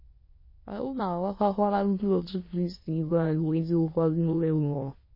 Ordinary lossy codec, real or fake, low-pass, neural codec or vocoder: MP3, 24 kbps; fake; 5.4 kHz; autoencoder, 22.05 kHz, a latent of 192 numbers a frame, VITS, trained on many speakers